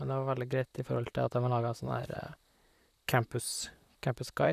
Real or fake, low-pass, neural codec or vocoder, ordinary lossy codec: fake; 14.4 kHz; vocoder, 44.1 kHz, 128 mel bands, Pupu-Vocoder; none